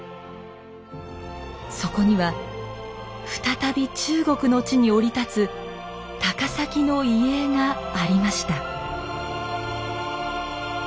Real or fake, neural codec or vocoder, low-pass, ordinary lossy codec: real; none; none; none